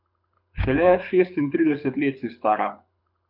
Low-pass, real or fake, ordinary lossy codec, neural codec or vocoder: 5.4 kHz; fake; none; codec, 24 kHz, 6 kbps, HILCodec